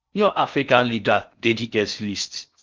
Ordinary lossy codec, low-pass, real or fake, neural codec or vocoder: Opus, 24 kbps; 7.2 kHz; fake; codec, 16 kHz in and 24 kHz out, 0.6 kbps, FocalCodec, streaming, 4096 codes